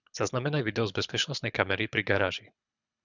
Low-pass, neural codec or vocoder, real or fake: 7.2 kHz; codec, 24 kHz, 6 kbps, HILCodec; fake